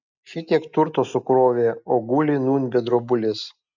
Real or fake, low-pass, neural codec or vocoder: real; 7.2 kHz; none